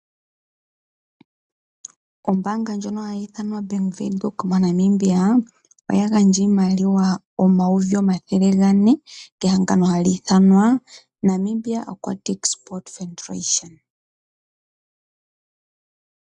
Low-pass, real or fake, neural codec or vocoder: 10.8 kHz; real; none